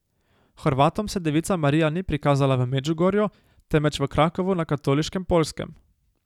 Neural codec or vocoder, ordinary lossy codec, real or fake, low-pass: none; none; real; 19.8 kHz